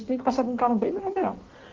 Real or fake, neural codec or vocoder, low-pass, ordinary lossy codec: fake; codec, 16 kHz in and 24 kHz out, 1.1 kbps, FireRedTTS-2 codec; 7.2 kHz; Opus, 16 kbps